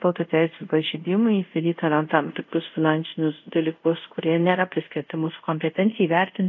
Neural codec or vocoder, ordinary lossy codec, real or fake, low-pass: codec, 24 kHz, 0.5 kbps, DualCodec; AAC, 48 kbps; fake; 7.2 kHz